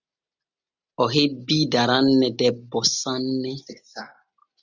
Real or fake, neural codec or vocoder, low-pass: real; none; 7.2 kHz